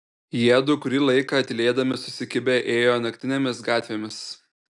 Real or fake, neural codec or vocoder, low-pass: real; none; 10.8 kHz